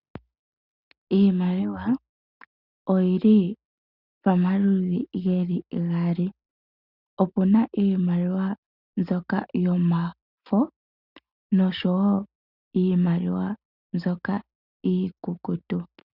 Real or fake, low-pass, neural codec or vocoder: real; 5.4 kHz; none